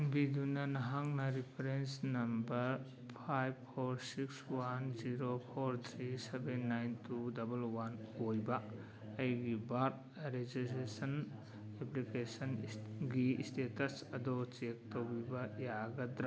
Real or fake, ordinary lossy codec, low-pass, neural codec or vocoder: real; none; none; none